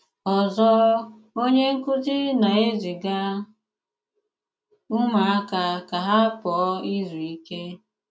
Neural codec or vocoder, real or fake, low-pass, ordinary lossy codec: none; real; none; none